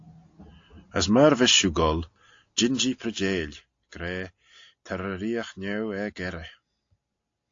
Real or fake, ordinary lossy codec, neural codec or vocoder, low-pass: real; AAC, 48 kbps; none; 7.2 kHz